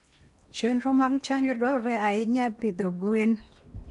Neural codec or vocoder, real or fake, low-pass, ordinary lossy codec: codec, 16 kHz in and 24 kHz out, 0.8 kbps, FocalCodec, streaming, 65536 codes; fake; 10.8 kHz; none